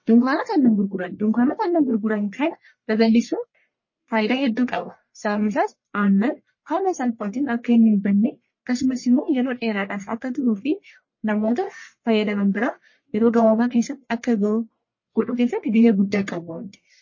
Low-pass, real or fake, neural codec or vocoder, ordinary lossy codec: 7.2 kHz; fake; codec, 44.1 kHz, 1.7 kbps, Pupu-Codec; MP3, 32 kbps